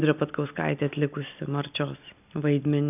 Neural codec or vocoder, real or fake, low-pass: none; real; 3.6 kHz